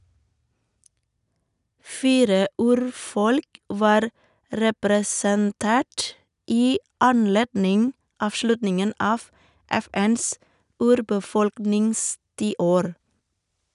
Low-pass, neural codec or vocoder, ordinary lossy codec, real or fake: 10.8 kHz; none; none; real